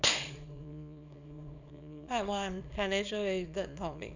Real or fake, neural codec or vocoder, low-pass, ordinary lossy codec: fake; codec, 24 kHz, 0.9 kbps, WavTokenizer, small release; 7.2 kHz; none